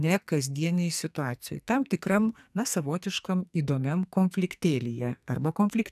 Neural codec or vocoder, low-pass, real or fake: codec, 44.1 kHz, 2.6 kbps, SNAC; 14.4 kHz; fake